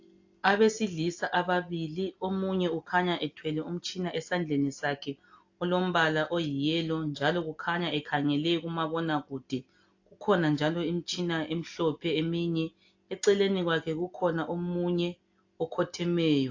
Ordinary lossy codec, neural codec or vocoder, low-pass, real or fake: AAC, 48 kbps; none; 7.2 kHz; real